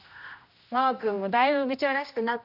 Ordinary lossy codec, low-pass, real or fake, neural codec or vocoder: none; 5.4 kHz; fake; codec, 16 kHz, 1 kbps, X-Codec, HuBERT features, trained on general audio